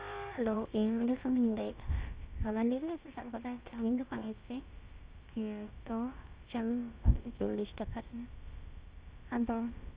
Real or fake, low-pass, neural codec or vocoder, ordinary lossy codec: fake; 3.6 kHz; codec, 16 kHz, about 1 kbps, DyCAST, with the encoder's durations; Opus, 32 kbps